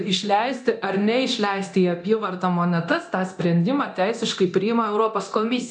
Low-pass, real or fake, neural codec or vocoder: 10.8 kHz; fake; codec, 24 kHz, 0.9 kbps, DualCodec